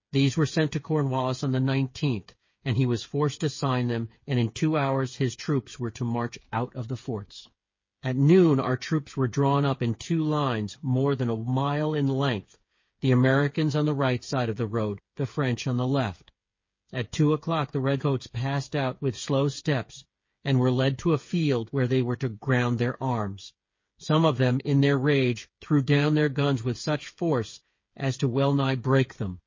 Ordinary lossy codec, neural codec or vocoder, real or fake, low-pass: MP3, 32 kbps; codec, 16 kHz, 8 kbps, FreqCodec, smaller model; fake; 7.2 kHz